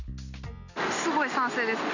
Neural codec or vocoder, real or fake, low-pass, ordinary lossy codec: none; real; 7.2 kHz; none